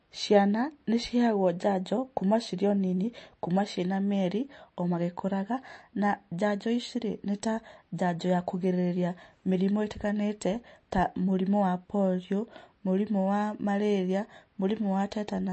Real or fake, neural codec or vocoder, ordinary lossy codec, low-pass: real; none; MP3, 32 kbps; 9.9 kHz